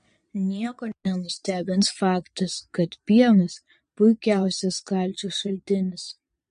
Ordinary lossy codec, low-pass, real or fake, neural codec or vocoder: MP3, 48 kbps; 9.9 kHz; fake; vocoder, 22.05 kHz, 80 mel bands, Vocos